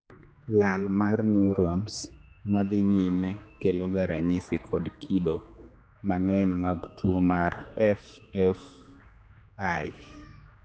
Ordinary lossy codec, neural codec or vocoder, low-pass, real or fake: none; codec, 16 kHz, 2 kbps, X-Codec, HuBERT features, trained on general audio; none; fake